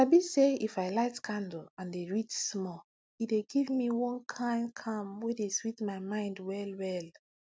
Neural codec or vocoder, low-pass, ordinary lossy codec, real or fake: none; none; none; real